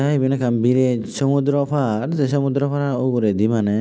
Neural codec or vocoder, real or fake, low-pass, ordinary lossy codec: none; real; none; none